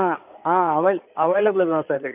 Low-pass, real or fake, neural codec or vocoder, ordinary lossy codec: 3.6 kHz; fake; codec, 16 kHz in and 24 kHz out, 2.2 kbps, FireRedTTS-2 codec; none